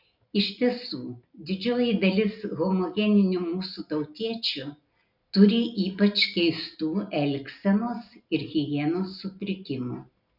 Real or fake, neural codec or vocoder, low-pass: real; none; 5.4 kHz